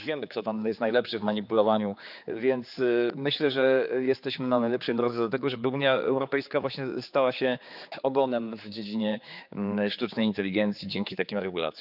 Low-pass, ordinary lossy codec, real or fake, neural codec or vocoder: 5.4 kHz; none; fake; codec, 16 kHz, 4 kbps, X-Codec, HuBERT features, trained on general audio